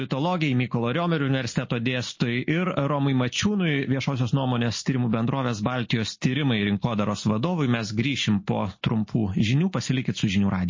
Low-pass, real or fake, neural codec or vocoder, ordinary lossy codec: 7.2 kHz; real; none; MP3, 32 kbps